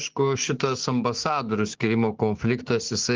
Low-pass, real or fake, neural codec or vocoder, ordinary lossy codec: 7.2 kHz; fake; codec, 16 kHz, 6 kbps, DAC; Opus, 16 kbps